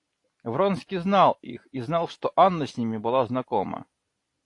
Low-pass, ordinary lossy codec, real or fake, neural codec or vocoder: 10.8 kHz; AAC, 48 kbps; real; none